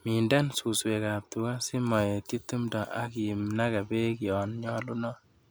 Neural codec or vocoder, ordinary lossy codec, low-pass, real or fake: none; none; none; real